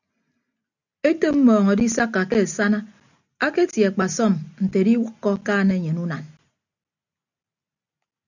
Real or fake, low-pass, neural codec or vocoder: real; 7.2 kHz; none